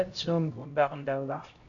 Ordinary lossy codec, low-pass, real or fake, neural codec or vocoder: Opus, 64 kbps; 7.2 kHz; fake; codec, 16 kHz, 0.5 kbps, X-Codec, HuBERT features, trained on LibriSpeech